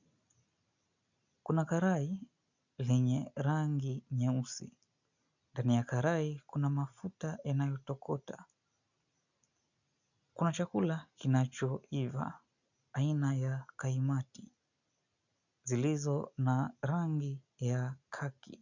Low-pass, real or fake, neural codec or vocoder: 7.2 kHz; real; none